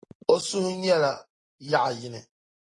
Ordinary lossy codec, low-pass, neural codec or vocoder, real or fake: AAC, 32 kbps; 10.8 kHz; none; real